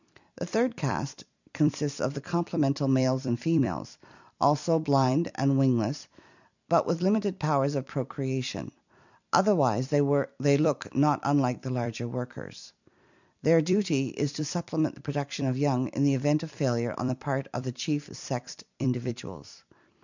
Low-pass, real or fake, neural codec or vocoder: 7.2 kHz; fake; vocoder, 44.1 kHz, 128 mel bands every 256 samples, BigVGAN v2